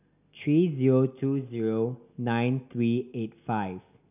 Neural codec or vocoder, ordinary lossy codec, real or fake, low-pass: none; none; real; 3.6 kHz